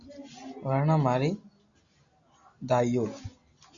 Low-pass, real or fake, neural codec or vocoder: 7.2 kHz; real; none